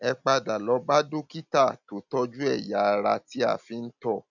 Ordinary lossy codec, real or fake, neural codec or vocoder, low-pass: none; real; none; 7.2 kHz